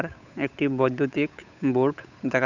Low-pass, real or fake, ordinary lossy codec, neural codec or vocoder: 7.2 kHz; fake; none; codec, 16 kHz, 8 kbps, FunCodec, trained on Chinese and English, 25 frames a second